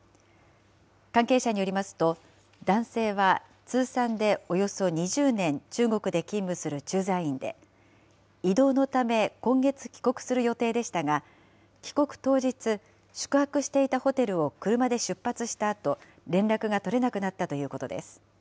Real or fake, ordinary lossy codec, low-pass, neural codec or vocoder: real; none; none; none